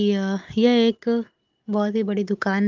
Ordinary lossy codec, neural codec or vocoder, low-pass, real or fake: Opus, 32 kbps; none; 7.2 kHz; real